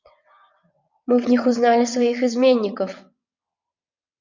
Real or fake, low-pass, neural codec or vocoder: fake; 7.2 kHz; vocoder, 22.05 kHz, 80 mel bands, WaveNeXt